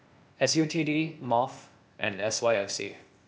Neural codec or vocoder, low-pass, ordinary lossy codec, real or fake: codec, 16 kHz, 0.8 kbps, ZipCodec; none; none; fake